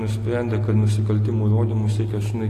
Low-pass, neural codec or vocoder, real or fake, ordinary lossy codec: 14.4 kHz; none; real; AAC, 48 kbps